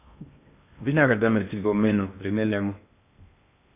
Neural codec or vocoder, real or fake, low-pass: codec, 16 kHz in and 24 kHz out, 0.6 kbps, FocalCodec, streaming, 2048 codes; fake; 3.6 kHz